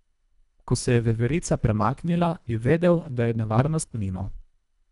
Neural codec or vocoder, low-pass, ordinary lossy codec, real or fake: codec, 24 kHz, 1.5 kbps, HILCodec; 10.8 kHz; MP3, 96 kbps; fake